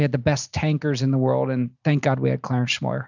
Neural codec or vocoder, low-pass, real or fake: none; 7.2 kHz; real